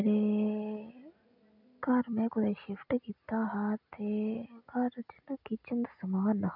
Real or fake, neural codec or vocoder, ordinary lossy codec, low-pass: real; none; none; 5.4 kHz